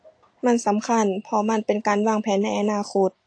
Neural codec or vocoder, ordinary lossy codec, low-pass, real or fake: none; AAC, 48 kbps; 9.9 kHz; real